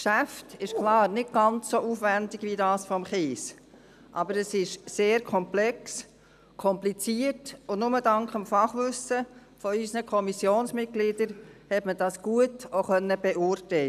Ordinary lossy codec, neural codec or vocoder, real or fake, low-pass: none; none; real; 14.4 kHz